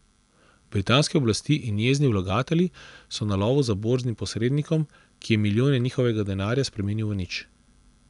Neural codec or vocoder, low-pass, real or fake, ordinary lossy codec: none; 10.8 kHz; real; none